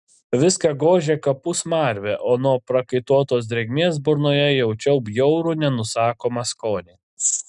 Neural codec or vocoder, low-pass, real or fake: none; 10.8 kHz; real